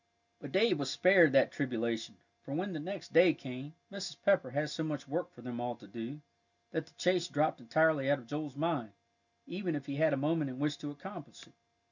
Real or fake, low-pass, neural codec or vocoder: real; 7.2 kHz; none